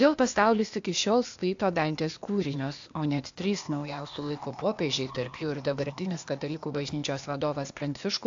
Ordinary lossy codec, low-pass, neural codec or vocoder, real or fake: AAC, 64 kbps; 7.2 kHz; codec, 16 kHz, 0.8 kbps, ZipCodec; fake